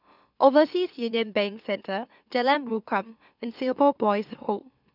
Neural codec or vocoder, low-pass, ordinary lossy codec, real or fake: autoencoder, 44.1 kHz, a latent of 192 numbers a frame, MeloTTS; 5.4 kHz; none; fake